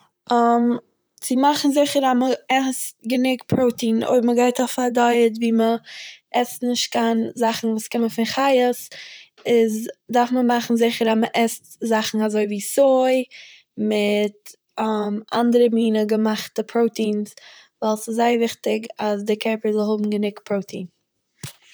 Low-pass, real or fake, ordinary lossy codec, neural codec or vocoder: none; fake; none; vocoder, 44.1 kHz, 128 mel bands, Pupu-Vocoder